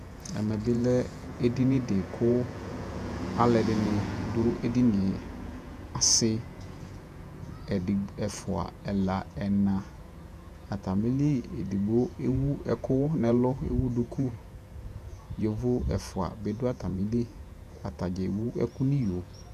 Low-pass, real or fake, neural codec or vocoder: 14.4 kHz; fake; vocoder, 48 kHz, 128 mel bands, Vocos